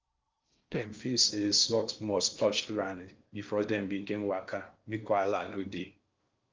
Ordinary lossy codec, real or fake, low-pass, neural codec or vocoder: Opus, 24 kbps; fake; 7.2 kHz; codec, 16 kHz in and 24 kHz out, 0.6 kbps, FocalCodec, streaming, 4096 codes